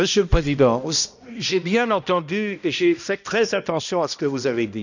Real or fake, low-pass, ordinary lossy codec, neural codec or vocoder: fake; 7.2 kHz; none; codec, 16 kHz, 1 kbps, X-Codec, HuBERT features, trained on balanced general audio